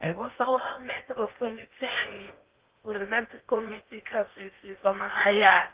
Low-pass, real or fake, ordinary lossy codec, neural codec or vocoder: 3.6 kHz; fake; Opus, 32 kbps; codec, 16 kHz in and 24 kHz out, 0.8 kbps, FocalCodec, streaming, 65536 codes